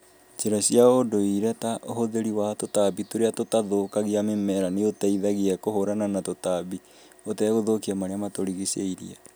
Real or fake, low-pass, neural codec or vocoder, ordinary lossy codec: real; none; none; none